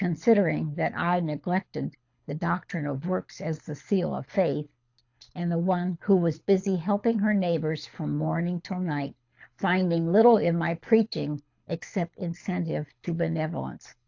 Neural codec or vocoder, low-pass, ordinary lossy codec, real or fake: codec, 24 kHz, 6 kbps, HILCodec; 7.2 kHz; AAC, 48 kbps; fake